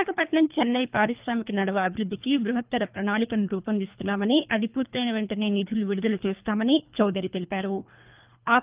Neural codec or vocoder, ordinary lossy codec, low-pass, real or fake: codec, 24 kHz, 3 kbps, HILCodec; Opus, 24 kbps; 3.6 kHz; fake